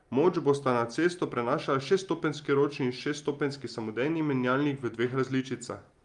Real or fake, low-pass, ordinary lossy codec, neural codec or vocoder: real; 10.8 kHz; Opus, 32 kbps; none